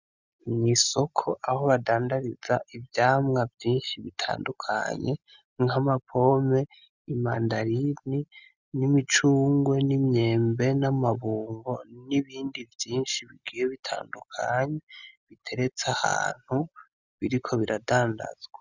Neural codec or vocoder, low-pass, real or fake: none; 7.2 kHz; real